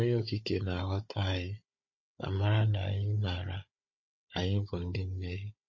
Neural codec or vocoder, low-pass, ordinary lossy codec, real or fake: codec, 16 kHz, 16 kbps, FunCodec, trained on Chinese and English, 50 frames a second; 7.2 kHz; MP3, 32 kbps; fake